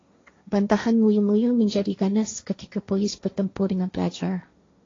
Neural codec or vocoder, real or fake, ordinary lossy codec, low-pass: codec, 16 kHz, 1.1 kbps, Voila-Tokenizer; fake; AAC, 32 kbps; 7.2 kHz